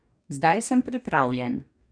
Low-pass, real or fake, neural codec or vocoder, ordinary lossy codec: 9.9 kHz; fake; codec, 44.1 kHz, 2.6 kbps, DAC; none